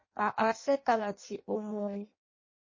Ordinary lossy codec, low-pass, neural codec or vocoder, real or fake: MP3, 32 kbps; 7.2 kHz; codec, 16 kHz in and 24 kHz out, 0.6 kbps, FireRedTTS-2 codec; fake